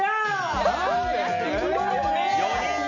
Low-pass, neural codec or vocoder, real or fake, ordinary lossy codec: 7.2 kHz; none; real; none